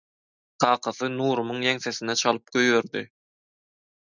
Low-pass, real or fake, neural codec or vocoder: 7.2 kHz; real; none